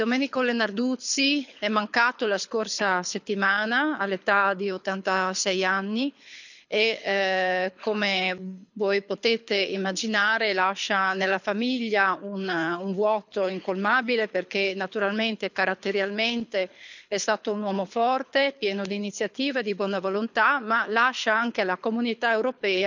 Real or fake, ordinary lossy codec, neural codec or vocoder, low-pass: fake; none; codec, 24 kHz, 6 kbps, HILCodec; 7.2 kHz